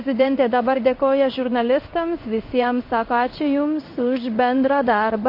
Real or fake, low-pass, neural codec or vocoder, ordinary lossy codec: fake; 5.4 kHz; codec, 16 kHz in and 24 kHz out, 1 kbps, XY-Tokenizer; MP3, 32 kbps